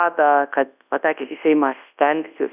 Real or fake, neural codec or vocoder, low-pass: fake; codec, 24 kHz, 0.9 kbps, WavTokenizer, large speech release; 3.6 kHz